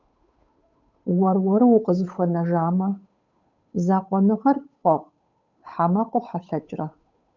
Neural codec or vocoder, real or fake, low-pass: codec, 16 kHz, 8 kbps, FunCodec, trained on Chinese and English, 25 frames a second; fake; 7.2 kHz